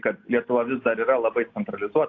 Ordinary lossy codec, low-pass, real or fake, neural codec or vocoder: AAC, 48 kbps; 7.2 kHz; real; none